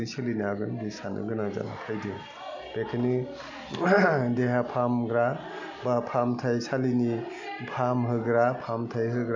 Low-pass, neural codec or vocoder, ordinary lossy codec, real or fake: 7.2 kHz; none; MP3, 64 kbps; real